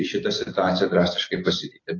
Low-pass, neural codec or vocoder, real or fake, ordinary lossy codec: 7.2 kHz; none; real; AAC, 32 kbps